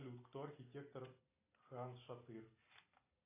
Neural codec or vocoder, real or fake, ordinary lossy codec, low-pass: none; real; AAC, 32 kbps; 3.6 kHz